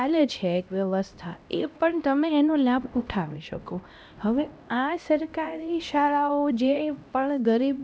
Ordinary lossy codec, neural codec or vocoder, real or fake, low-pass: none; codec, 16 kHz, 1 kbps, X-Codec, HuBERT features, trained on LibriSpeech; fake; none